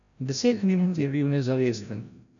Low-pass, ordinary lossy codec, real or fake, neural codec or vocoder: 7.2 kHz; none; fake; codec, 16 kHz, 0.5 kbps, FreqCodec, larger model